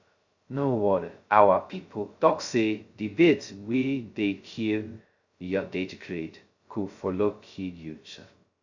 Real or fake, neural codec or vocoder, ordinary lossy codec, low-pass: fake; codec, 16 kHz, 0.2 kbps, FocalCodec; Opus, 64 kbps; 7.2 kHz